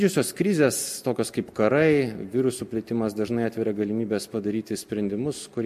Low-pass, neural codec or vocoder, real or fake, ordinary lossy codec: 14.4 kHz; none; real; MP3, 64 kbps